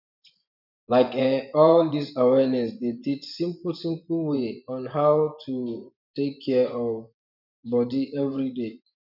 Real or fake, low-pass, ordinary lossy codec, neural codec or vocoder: fake; 5.4 kHz; none; vocoder, 24 kHz, 100 mel bands, Vocos